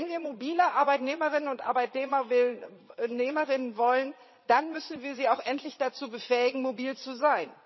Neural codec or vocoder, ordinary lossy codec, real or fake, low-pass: vocoder, 22.05 kHz, 80 mel bands, Vocos; MP3, 24 kbps; fake; 7.2 kHz